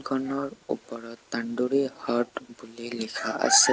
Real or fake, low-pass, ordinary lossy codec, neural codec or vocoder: real; none; none; none